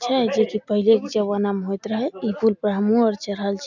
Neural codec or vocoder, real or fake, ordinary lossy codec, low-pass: none; real; none; 7.2 kHz